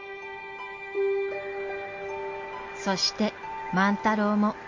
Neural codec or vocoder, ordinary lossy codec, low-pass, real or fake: none; MP3, 48 kbps; 7.2 kHz; real